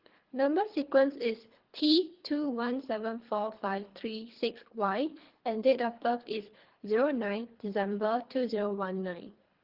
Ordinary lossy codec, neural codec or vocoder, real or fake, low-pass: Opus, 16 kbps; codec, 24 kHz, 3 kbps, HILCodec; fake; 5.4 kHz